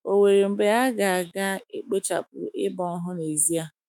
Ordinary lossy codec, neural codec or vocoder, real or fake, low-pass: none; autoencoder, 48 kHz, 128 numbers a frame, DAC-VAE, trained on Japanese speech; fake; 19.8 kHz